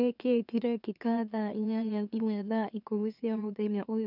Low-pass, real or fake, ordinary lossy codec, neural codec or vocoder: 5.4 kHz; fake; none; autoencoder, 44.1 kHz, a latent of 192 numbers a frame, MeloTTS